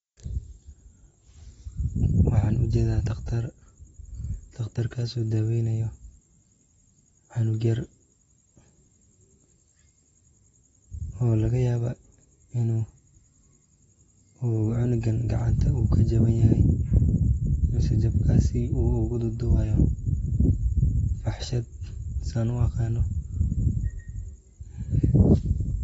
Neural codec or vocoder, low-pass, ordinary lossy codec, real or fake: none; 19.8 kHz; AAC, 24 kbps; real